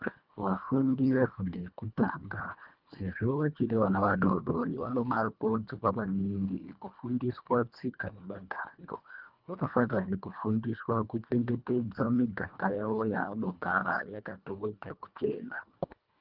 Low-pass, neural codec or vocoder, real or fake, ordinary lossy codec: 5.4 kHz; codec, 24 kHz, 1.5 kbps, HILCodec; fake; Opus, 24 kbps